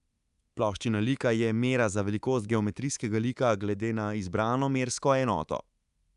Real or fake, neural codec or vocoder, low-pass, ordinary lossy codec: fake; codec, 24 kHz, 3.1 kbps, DualCodec; 10.8 kHz; Opus, 64 kbps